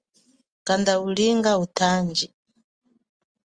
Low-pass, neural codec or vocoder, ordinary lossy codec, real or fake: 9.9 kHz; none; Opus, 32 kbps; real